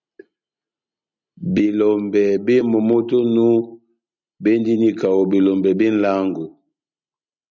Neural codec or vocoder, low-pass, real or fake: none; 7.2 kHz; real